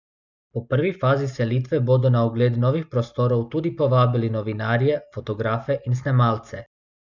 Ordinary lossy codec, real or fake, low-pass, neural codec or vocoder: none; real; 7.2 kHz; none